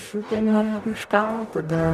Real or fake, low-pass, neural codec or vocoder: fake; 14.4 kHz; codec, 44.1 kHz, 0.9 kbps, DAC